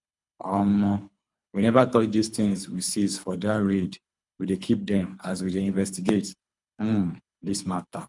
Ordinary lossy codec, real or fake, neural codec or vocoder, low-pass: none; fake; codec, 24 kHz, 3 kbps, HILCodec; 10.8 kHz